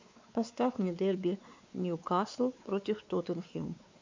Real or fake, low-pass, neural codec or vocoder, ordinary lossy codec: fake; 7.2 kHz; codec, 16 kHz, 4 kbps, X-Codec, WavLM features, trained on Multilingual LibriSpeech; MP3, 64 kbps